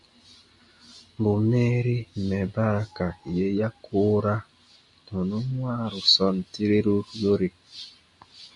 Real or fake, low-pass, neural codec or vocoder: real; 10.8 kHz; none